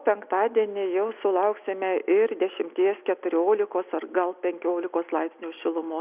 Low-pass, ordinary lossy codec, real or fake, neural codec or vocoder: 3.6 kHz; Opus, 64 kbps; real; none